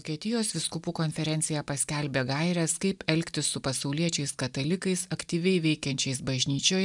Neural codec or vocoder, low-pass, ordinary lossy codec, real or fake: none; 10.8 kHz; MP3, 96 kbps; real